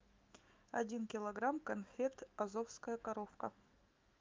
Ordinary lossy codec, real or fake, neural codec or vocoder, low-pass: Opus, 24 kbps; fake; autoencoder, 48 kHz, 128 numbers a frame, DAC-VAE, trained on Japanese speech; 7.2 kHz